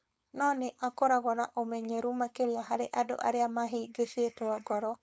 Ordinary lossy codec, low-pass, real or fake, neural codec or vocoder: none; none; fake; codec, 16 kHz, 4.8 kbps, FACodec